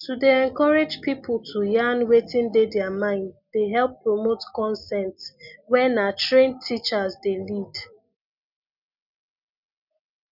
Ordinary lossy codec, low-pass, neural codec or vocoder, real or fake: none; 5.4 kHz; none; real